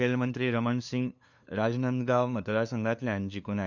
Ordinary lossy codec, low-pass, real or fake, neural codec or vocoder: none; 7.2 kHz; fake; codec, 16 kHz, 2 kbps, FunCodec, trained on LibriTTS, 25 frames a second